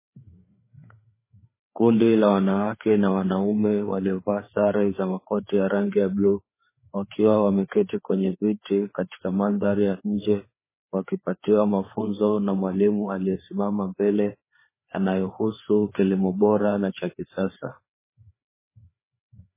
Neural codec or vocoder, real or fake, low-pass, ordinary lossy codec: codec, 16 kHz, 4 kbps, FreqCodec, larger model; fake; 3.6 kHz; MP3, 16 kbps